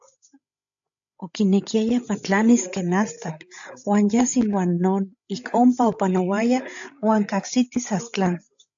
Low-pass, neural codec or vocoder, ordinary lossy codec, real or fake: 7.2 kHz; codec, 16 kHz, 16 kbps, FreqCodec, larger model; AAC, 64 kbps; fake